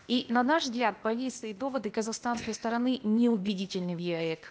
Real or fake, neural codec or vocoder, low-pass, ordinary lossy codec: fake; codec, 16 kHz, 0.8 kbps, ZipCodec; none; none